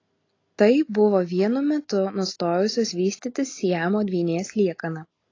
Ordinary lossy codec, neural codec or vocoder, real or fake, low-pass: AAC, 32 kbps; none; real; 7.2 kHz